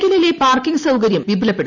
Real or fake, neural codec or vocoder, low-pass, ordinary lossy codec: real; none; 7.2 kHz; none